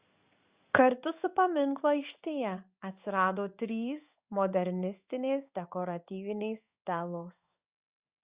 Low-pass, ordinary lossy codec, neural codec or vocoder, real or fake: 3.6 kHz; Opus, 64 kbps; codec, 16 kHz in and 24 kHz out, 1 kbps, XY-Tokenizer; fake